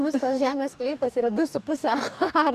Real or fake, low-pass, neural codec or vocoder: fake; 14.4 kHz; codec, 44.1 kHz, 2.6 kbps, DAC